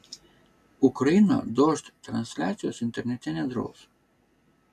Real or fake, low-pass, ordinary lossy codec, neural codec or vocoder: real; 14.4 kHz; AAC, 96 kbps; none